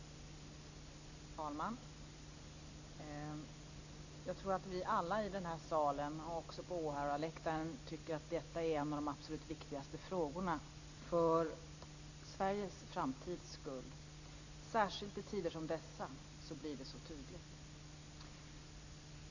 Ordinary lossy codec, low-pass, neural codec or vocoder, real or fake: none; 7.2 kHz; none; real